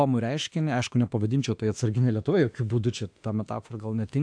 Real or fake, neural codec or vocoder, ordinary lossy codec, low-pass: fake; autoencoder, 48 kHz, 32 numbers a frame, DAC-VAE, trained on Japanese speech; AAC, 64 kbps; 9.9 kHz